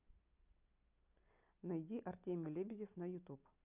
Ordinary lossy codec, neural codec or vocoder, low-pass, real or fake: none; none; 3.6 kHz; real